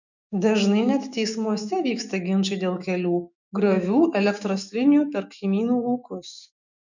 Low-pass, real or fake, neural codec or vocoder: 7.2 kHz; fake; autoencoder, 48 kHz, 128 numbers a frame, DAC-VAE, trained on Japanese speech